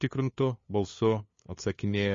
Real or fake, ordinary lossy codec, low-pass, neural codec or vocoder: fake; MP3, 32 kbps; 7.2 kHz; codec, 16 kHz, 2 kbps, FunCodec, trained on LibriTTS, 25 frames a second